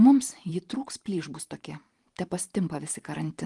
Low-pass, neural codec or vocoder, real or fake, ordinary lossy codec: 10.8 kHz; vocoder, 44.1 kHz, 128 mel bands every 512 samples, BigVGAN v2; fake; Opus, 24 kbps